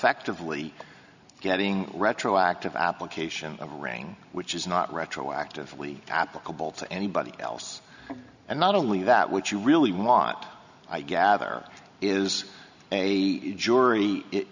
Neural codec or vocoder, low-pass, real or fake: none; 7.2 kHz; real